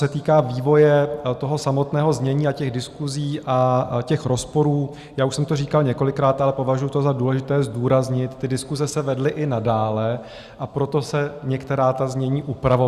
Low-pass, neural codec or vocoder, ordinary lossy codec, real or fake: 14.4 kHz; none; Opus, 64 kbps; real